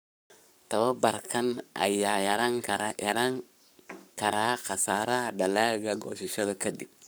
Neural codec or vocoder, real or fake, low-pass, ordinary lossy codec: codec, 44.1 kHz, 7.8 kbps, Pupu-Codec; fake; none; none